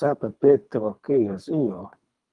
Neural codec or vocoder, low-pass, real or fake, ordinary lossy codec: codec, 24 kHz, 3 kbps, HILCodec; 10.8 kHz; fake; Opus, 32 kbps